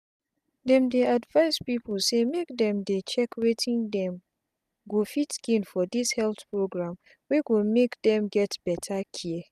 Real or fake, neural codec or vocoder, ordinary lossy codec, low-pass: real; none; none; 14.4 kHz